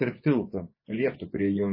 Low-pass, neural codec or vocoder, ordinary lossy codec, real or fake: 5.4 kHz; vocoder, 24 kHz, 100 mel bands, Vocos; MP3, 24 kbps; fake